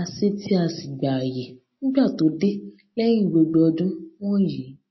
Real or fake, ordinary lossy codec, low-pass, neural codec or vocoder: real; MP3, 24 kbps; 7.2 kHz; none